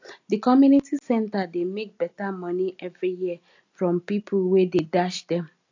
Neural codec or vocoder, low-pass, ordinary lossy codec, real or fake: none; 7.2 kHz; AAC, 48 kbps; real